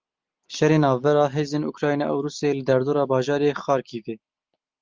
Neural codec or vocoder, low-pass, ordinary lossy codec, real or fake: none; 7.2 kHz; Opus, 32 kbps; real